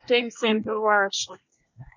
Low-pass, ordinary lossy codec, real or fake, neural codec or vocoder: 7.2 kHz; MP3, 48 kbps; fake; codec, 24 kHz, 1 kbps, SNAC